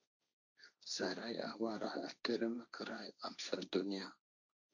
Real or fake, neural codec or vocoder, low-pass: fake; codec, 16 kHz, 1.1 kbps, Voila-Tokenizer; 7.2 kHz